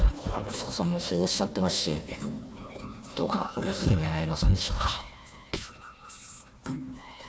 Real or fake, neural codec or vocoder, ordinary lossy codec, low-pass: fake; codec, 16 kHz, 1 kbps, FunCodec, trained on Chinese and English, 50 frames a second; none; none